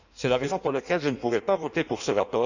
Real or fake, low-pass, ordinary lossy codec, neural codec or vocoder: fake; 7.2 kHz; none; codec, 16 kHz in and 24 kHz out, 1.1 kbps, FireRedTTS-2 codec